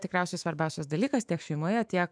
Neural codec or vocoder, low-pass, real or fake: autoencoder, 48 kHz, 128 numbers a frame, DAC-VAE, trained on Japanese speech; 9.9 kHz; fake